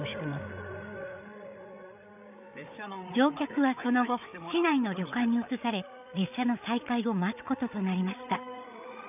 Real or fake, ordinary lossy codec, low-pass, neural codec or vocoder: fake; none; 3.6 kHz; codec, 16 kHz, 8 kbps, FreqCodec, larger model